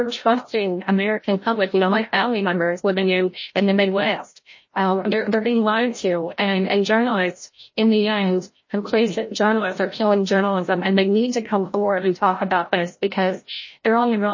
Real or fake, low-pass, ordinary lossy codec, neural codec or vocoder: fake; 7.2 kHz; MP3, 32 kbps; codec, 16 kHz, 0.5 kbps, FreqCodec, larger model